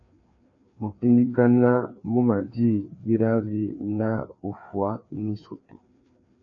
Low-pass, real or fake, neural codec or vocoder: 7.2 kHz; fake; codec, 16 kHz, 2 kbps, FreqCodec, larger model